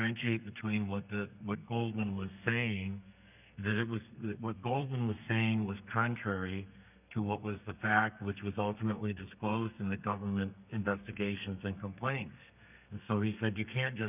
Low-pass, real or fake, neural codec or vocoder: 3.6 kHz; fake; codec, 32 kHz, 1.9 kbps, SNAC